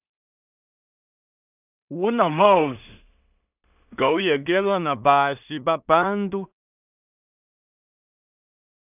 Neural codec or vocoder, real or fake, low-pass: codec, 16 kHz in and 24 kHz out, 0.4 kbps, LongCat-Audio-Codec, two codebook decoder; fake; 3.6 kHz